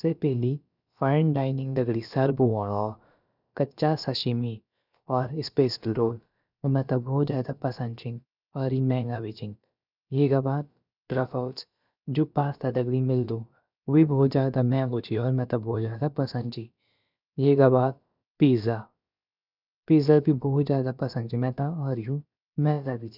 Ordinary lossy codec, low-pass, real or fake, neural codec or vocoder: none; 5.4 kHz; fake; codec, 16 kHz, about 1 kbps, DyCAST, with the encoder's durations